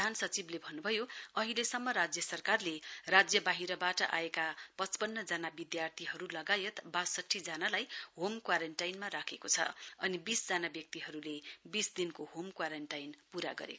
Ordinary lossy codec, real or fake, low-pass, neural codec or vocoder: none; real; none; none